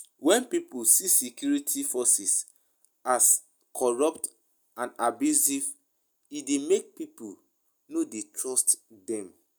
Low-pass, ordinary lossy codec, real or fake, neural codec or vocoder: none; none; real; none